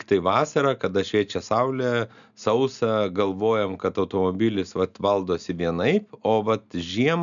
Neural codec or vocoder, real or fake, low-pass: none; real; 7.2 kHz